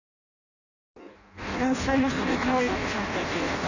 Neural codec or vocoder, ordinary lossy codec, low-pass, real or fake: codec, 16 kHz in and 24 kHz out, 0.6 kbps, FireRedTTS-2 codec; none; 7.2 kHz; fake